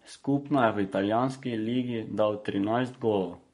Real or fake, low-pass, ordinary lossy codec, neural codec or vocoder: fake; 19.8 kHz; MP3, 48 kbps; codec, 44.1 kHz, 7.8 kbps, Pupu-Codec